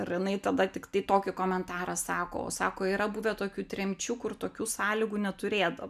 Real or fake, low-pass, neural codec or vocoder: real; 14.4 kHz; none